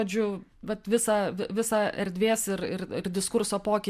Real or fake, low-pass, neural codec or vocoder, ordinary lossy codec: real; 14.4 kHz; none; MP3, 96 kbps